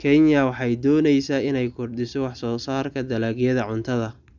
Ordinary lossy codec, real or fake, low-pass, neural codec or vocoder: none; real; 7.2 kHz; none